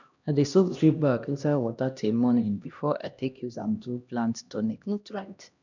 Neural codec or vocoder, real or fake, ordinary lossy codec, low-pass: codec, 16 kHz, 1 kbps, X-Codec, HuBERT features, trained on LibriSpeech; fake; none; 7.2 kHz